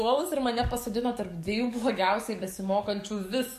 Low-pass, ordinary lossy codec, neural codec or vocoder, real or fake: 14.4 kHz; MP3, 64 kbps; codec, 44.1 kHz, 7.8 kbps, DAC; fake